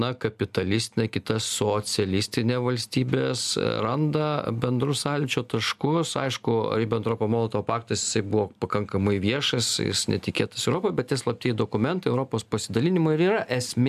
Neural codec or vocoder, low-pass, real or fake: none; 14.4 kHz; real